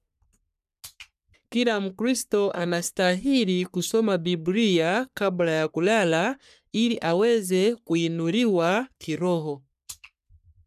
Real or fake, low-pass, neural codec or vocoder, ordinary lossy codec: fake; 14.4 kHz; codec, 44.1 kHz, 3.4 kbps, Pupu-Codec; none